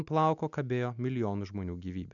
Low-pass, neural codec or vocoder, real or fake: 7.2 kHz; none; real